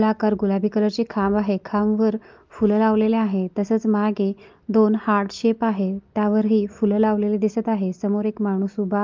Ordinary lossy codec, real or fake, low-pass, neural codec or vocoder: Opus, 24 kbps; real; 7.2 kHz; none